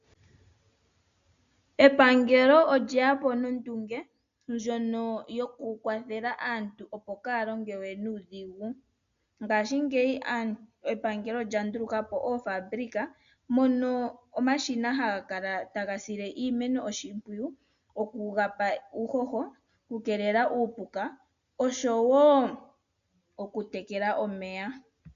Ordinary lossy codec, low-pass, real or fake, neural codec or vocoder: MP3, 96 kbps; 7.2 kHz; real; none